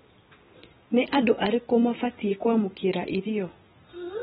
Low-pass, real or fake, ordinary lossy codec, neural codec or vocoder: 10.8 kHz; real; AAC, 16 kbps; none